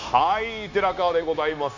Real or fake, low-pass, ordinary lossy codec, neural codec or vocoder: fake; 7.2 kHz; none; codec, 16 kHz, 0.9 kbps, LongCat-Audio-Codec